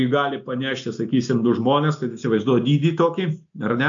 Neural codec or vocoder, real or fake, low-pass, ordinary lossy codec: none; real; 7.2 kHz; AAC, 64 kbps